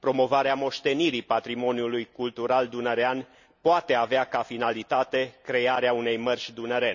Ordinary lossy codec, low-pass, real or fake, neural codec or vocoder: none; 7.2 kHz; real; none